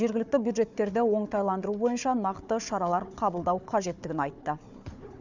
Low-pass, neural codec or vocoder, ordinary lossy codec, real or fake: 7.2 kHz; codec, 16 kHz, 4 kbps, FunCodec, trained on Chinese and English, 50 frames a second; none; fake